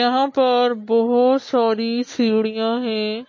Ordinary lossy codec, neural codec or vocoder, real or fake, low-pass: MP3, 32 kbps; none; real; 7.2 kHz